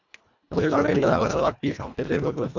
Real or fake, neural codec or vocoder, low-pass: fake; codec, 24 kHz, 1.5 kbps, HILCodec; 7.2 kHz